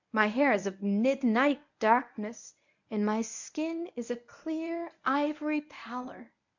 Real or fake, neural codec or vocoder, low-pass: fake; codec, 24 kHz, 0.9 kbps, WavTokenizer, medium speech release version 1; 7.2 kHz